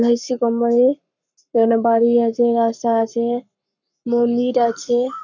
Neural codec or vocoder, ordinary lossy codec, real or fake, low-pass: codec, 44.1 kHz, 7.8 kbps, Pupu-Codec; none; fake; 7.2 kHz